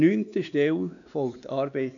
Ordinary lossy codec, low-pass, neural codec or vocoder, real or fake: none; 7.2 kHz; codec, 16 kHz, 2 kbps, X-Codec, WavLM features, trained on Multilingual LibriSpeech; fake